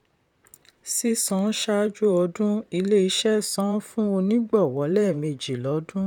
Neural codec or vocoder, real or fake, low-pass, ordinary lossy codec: vocoder, 44.1 kHz, 128 mel bands, Pupu-Vocoder; fake; 19.8 kHz; none